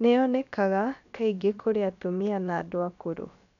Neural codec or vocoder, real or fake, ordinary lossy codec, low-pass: codec, 16 kHz, about 1 kbps, DyCAST, with the encoder's durations; fake; none; 7.2 kHz